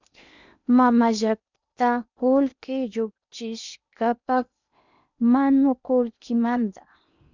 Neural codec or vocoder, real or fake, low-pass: codec, 16 kHz in and 24 kHz out, 0.8 kbps, FocalCodec, streaming, 65536 codes; fake; 7.2 kHz